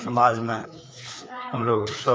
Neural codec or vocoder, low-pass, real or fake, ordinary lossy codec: codec, 16 kHz, 4 kbps, FreqCodec, larger model; none; fake; none